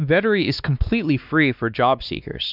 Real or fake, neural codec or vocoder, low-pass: fake; codec, 16 kHz, 2 kbps, X-Codec, WavLM features, trained on Multilingual LibriSpeech; 5.4 kHz